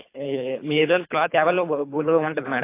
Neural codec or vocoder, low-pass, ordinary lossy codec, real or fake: codec, 24 kHz, 3 kbps, HILCodec; 3.6 kHz; AAC, 24 kbps; fake